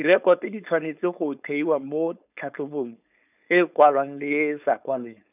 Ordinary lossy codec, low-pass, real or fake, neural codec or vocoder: none; 3.6 kHz; fake; codec, 16 kHz, 4.8 kbps, FACodec